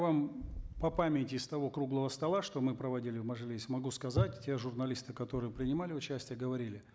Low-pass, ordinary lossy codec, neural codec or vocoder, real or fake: none; none; none; real